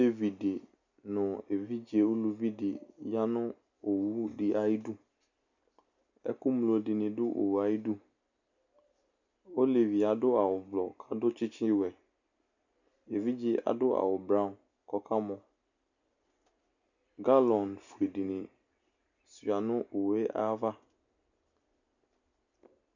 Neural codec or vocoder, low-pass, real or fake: none; 7.2 kHz; real